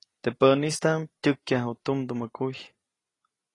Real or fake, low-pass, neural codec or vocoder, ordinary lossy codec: real; 10.8 kHz; none; AAC, 32 kbps